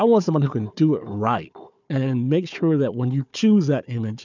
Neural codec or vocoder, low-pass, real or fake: codec, 16 kHz, 4 kbps, FunCodec, trained on Chinese and English, 50 frames a second; 7.2 kHz; fake